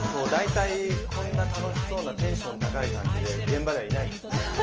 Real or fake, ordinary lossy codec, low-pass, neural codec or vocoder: real; Opus, 24 kbps; 7.2 kHz; none